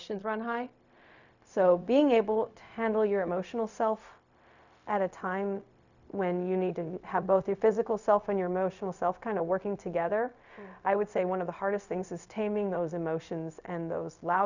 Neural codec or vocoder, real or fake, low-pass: codec, 16 kHz, 0.4 kbps, LongCat-Audio-Codec; fake; 7.2 kHz